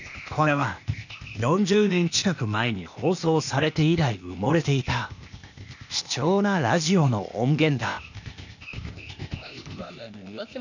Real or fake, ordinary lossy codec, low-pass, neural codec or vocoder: fake; none; 7.2 kHz; codec, 16 kHz, 0.8 kbps, ZipCodec